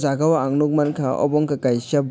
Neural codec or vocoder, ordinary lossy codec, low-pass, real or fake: none; none; none; real